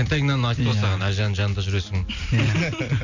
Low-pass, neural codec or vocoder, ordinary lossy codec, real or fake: 7.2 kHz; none; none; real